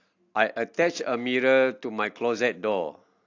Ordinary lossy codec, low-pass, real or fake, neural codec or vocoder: AAC, 48 kbps; 7.2 kHz; real; none